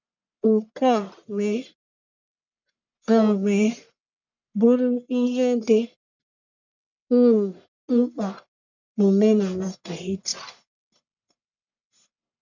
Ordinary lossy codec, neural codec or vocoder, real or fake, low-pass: none; codec, 44.1 kHz, 1.7 kbps, Pupu-Codec; fake; 7.2 kHz